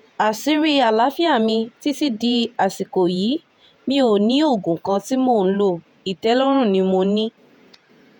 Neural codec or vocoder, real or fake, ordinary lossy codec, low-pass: vocoder, 48 kHz, 128 mel bands, Vocos; fake; none; 19.8 kHz